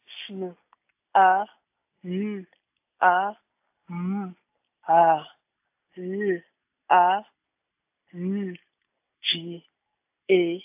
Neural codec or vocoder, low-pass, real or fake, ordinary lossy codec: none; 3.6 kHz; real; AAC, 32 kbps